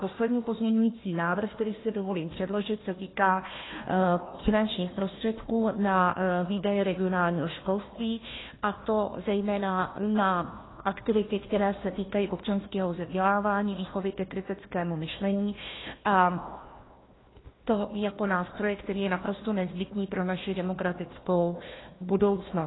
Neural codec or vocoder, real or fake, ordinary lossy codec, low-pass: codec, 16 kHz, 1 kbps, FunCodec, trained on Chinese and English, 50 frames a second; fake; AAC, 16 kbps; 7.2 kHz